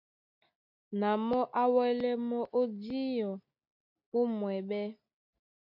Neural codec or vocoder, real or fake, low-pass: none; real; 5.4 kHz